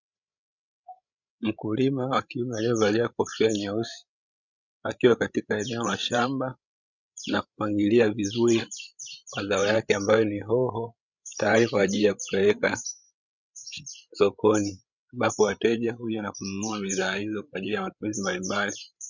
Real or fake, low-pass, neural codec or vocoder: fake; 7.2 kHz; codec, 16 kHz, 16 kbps, FreqCodec, larger model